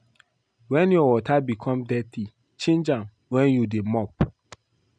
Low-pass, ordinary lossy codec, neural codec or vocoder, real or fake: 9.9 kHz; none; none; real